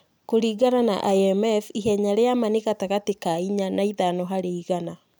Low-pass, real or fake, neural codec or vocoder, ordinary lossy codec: none; real; none; none